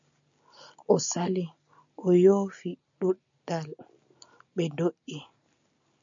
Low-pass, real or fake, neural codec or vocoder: 7.2 kHz; real; none